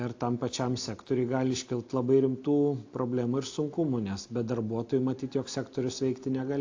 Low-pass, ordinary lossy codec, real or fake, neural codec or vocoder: 7.2 kHz; AAC, 48 kbps; real; none